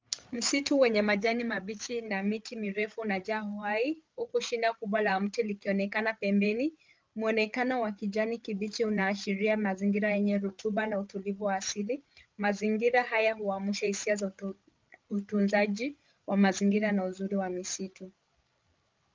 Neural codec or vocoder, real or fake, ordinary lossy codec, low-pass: codec, 16 kHz, 8 kbps, FreqCodec, larger model; fake; Opus, 24 kbps; 7.2 kHz